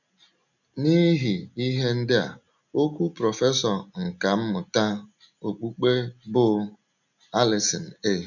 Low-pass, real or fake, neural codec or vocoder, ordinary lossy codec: 7.2 kHz; real; none; none